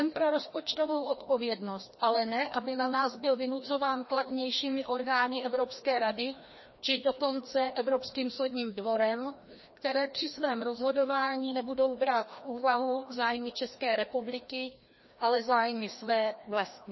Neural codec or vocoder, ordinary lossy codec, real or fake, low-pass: codec, 16 kHz, 1 kbps, FreqCodec, larger model; MP3, 24 kbps; fake; 7.2 kHz